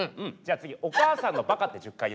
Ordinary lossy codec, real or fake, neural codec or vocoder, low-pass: none; real; none; none